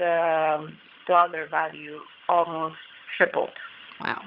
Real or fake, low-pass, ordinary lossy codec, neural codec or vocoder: fake; 5.4 kHz; Opus, 64 kbps; vocoder, 22.05 kHz, 80 mel bands, HiFi-GAN